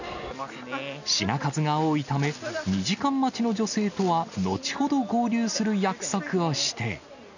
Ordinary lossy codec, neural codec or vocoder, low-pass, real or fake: none; none; 7.2 kHz; real